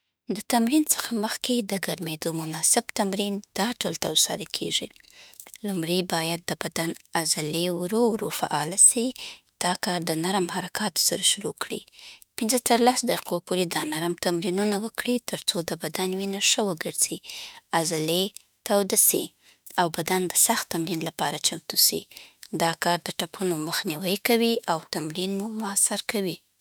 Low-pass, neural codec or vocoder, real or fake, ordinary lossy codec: none; autoencoder, 48 kHz, 32 numbers a frame, DAC-VAE, trained on Japanese speech; fake; none